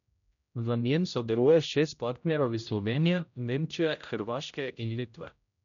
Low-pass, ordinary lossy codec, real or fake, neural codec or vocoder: 7.2 kHz; none; fake; codec, 16 kHz, 0.5 kbps, X-Codec, HuBERT features, trained on general audio